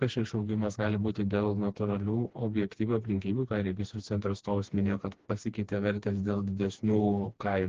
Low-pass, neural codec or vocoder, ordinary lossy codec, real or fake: 7.2 kHz; codec, 16 kHz, 2 kbps, FreqCodec, smaller model; Opus, 16 kbps; fake